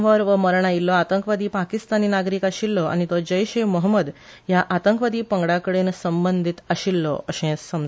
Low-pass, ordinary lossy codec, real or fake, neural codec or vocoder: none; none; real; none